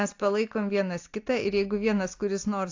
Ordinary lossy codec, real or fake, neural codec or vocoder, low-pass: AAC, 48 kbps; real; none; 7.2 kHz